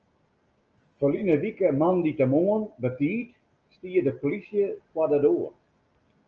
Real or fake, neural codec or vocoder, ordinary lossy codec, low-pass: real; none; Opus, 24 kbps; 7.2 kHz